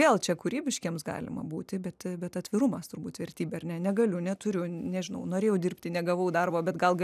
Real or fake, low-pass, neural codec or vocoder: real; 14.4 kHz; none